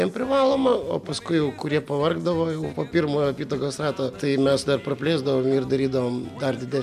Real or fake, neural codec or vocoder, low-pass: real; none; 14.4 kHz